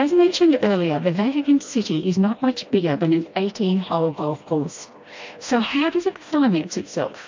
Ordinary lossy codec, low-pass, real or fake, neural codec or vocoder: MP3, 48 kbps; 7.2 kHz; fake; codec, 16 kHz, 1 kbps, FreqCodec, smaller model